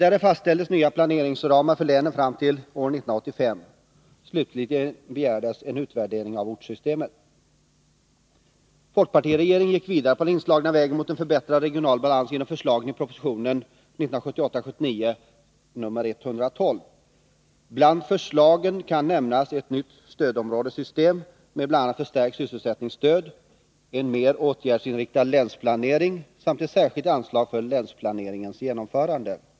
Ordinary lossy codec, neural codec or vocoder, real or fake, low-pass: none; none; real; none